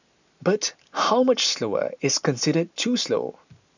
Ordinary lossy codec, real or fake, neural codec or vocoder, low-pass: none; real; none; 7.2 kHz